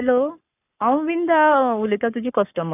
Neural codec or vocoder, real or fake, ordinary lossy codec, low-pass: codec, 44.1 kHz, 7.8 kbps, Pupu-Codec; fake; none; 3.6 kHz